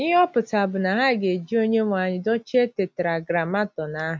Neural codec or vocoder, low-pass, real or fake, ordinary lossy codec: none; none; real; none